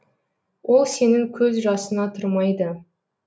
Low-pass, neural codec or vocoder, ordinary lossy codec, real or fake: none; none; none; real